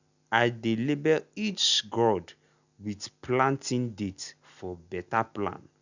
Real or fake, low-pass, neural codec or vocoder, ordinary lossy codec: real; 7.2 kHz; none; none